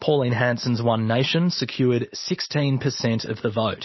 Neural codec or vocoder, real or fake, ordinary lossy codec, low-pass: none; real; MP3, 24 kbps; 7.2 kHz